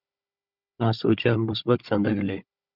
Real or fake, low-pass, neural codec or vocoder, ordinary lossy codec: fake; 5.4 kHz; codec, 16 kHz, 16 kbps, FunCodec, trained on Chinese and English, 50 frames a second; Opus, 64 kbps